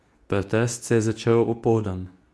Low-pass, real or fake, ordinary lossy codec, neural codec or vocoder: none; fake; none; codec, 24 kHz, 0.9 kbps, WavTokenizer, medium speech release version 2